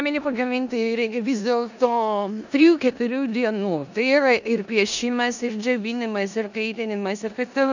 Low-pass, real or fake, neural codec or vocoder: 7.2 kHz; fake; codec, 16 kHz in and 24 kHz out, 0.9 kbps, LongCat-Audio-Codec, four codebook decoder